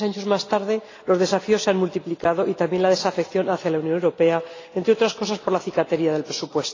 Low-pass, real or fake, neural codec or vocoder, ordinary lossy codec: 7.2 kHz; real; none; AAC, 32 kbps